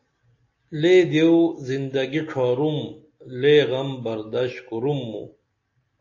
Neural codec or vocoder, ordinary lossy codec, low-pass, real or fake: none; AAC, 48 kbps; 7.2 kHz; real